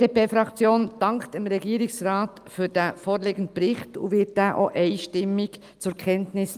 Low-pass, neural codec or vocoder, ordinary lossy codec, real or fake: 14.4 kHz; none; Opus, 32 kbps; real